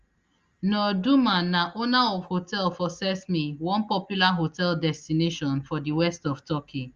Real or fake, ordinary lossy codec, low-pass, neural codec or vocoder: real; Opus, 32 kbps; 7.2 kHz; none